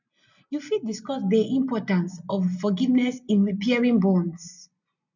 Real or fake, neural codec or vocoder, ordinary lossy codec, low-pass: real; none; none; 7.2 kHz